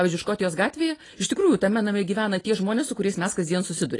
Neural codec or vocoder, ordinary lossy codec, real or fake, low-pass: none; AAC, 32 kbps; real; 10.8 kHz